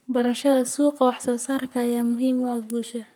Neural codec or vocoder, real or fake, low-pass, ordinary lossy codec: codec, 44.1 kHz, 3.4 kbps, Pupu-Codec; fake; none; none